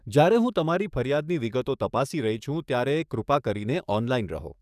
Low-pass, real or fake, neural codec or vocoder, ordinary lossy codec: 14.4 kHz; fake; codec, 44.1 kHz, 7.8 kbps, DAC; none